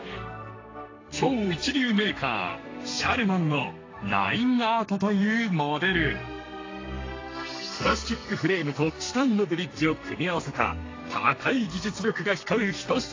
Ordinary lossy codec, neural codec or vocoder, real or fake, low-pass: AAC, 32 kbps; codec, 32 kHz, 1.9 kbps, SNAC; fake; 7.2 kHz